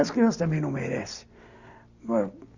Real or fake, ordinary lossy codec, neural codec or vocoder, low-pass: real; Opus, 64 kbps; none; 7.2 kHz